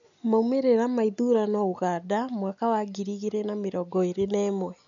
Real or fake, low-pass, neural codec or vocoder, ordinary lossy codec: real; 7.2 kHz; none; none